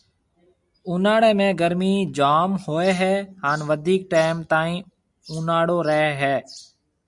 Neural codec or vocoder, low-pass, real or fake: none; 10.8 kHz; real